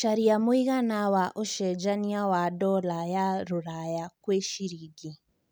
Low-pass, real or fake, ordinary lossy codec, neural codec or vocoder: none; real; none; none